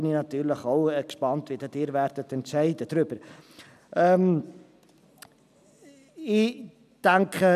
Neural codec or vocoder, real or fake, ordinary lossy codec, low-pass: none; real; none; 14.4 kHz